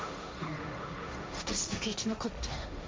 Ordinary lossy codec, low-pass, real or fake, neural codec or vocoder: none; none; fake; codec, 16 kHz, 1.1 kbps, Voila-Tokenizer